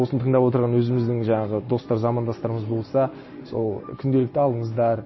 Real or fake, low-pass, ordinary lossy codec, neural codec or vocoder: real; 7.2 kHz; MP3, 24 kbps; none